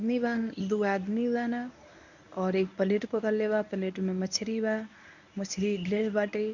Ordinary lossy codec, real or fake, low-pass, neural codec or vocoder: none; fake; 7.2 kHz; codec, 24 kHz, 0.9 kbps, WavTokenizer, medium speech release version 1